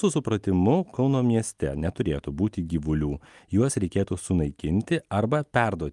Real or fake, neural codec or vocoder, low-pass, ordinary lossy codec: real; none; 10.8 kHz; Opus, 32 kbps